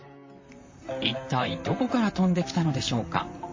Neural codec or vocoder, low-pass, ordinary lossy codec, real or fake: vocoder, 44.1 kHz, 128 mel bands, Pupu-Vocoder; 7.2 kHz; MP3, 32 kbps; fake